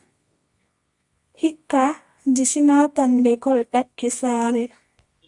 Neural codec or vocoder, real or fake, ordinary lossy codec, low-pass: codec, 24 kHz, 0.9 kbps, WavTokenizer, medium music audio release; fake; Opus, 64 kbps; 10.8 kHz